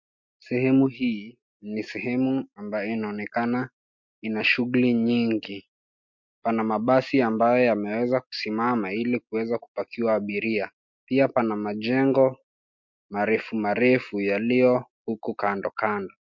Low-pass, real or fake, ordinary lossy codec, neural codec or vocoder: 7.2 kHz; real; MP3, 48 kbps; none